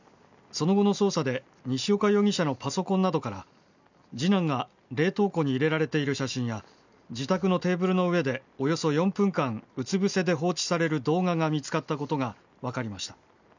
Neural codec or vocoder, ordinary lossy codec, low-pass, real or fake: none; none; 7.2 kHz; real